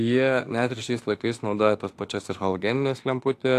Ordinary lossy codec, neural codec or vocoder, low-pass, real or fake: AAC, 64 kbps; autoencoder, 48 kHz, 32 numbers a frame, DAC-VAE, trained on Japanese speech; 14.4 kHz; fake